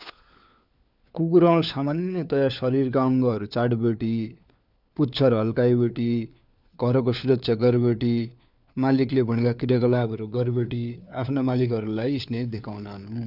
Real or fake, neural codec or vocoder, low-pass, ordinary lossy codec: fake; codec, 16 kHz, 4 kbps, FunCodec, trained on LibriTTS, 50 frames a second; 5.4 kHz; none